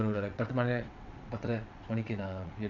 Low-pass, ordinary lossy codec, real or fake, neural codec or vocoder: 7.2 kHz; none; fake; vocoder, 22.05 kHz, 80 mel bands, WaveNeXt